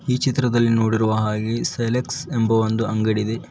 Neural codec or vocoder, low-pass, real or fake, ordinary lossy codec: none; none; real; none